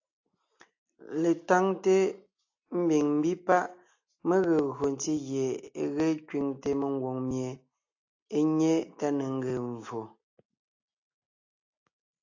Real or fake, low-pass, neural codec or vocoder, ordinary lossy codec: real; 7.2 kHz; none; AAC, 48 kbps